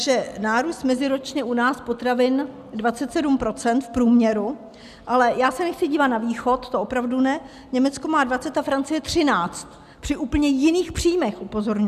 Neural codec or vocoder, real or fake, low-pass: none; real; 14.4 kHz